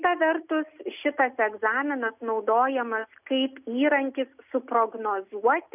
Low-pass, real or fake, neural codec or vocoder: 3.6 kHz; real; none